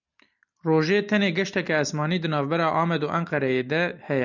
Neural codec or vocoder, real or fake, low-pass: none; real; 7.2 kHz